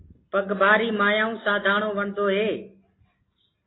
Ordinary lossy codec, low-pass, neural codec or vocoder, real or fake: AAC, 16 kbps; 7.2 kHz; none; real